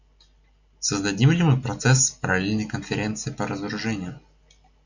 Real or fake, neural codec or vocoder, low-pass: real; none; 7.2 kHz